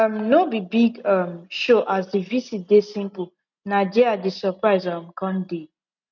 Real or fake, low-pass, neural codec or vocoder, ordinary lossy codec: real; 7.2 kHz; none; none